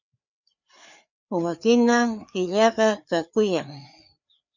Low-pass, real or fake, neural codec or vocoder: 7.2 kHz; fake; codec, 16 kHz, 4 kbps, FreqCodec, larger model